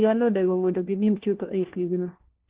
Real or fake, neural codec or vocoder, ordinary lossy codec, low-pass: fake; codec, 16 kHz, 1 kbps, FunCodec, trained on LibriTTS, 50 frames a second; Opus, 16 kbps; 3.6 kHz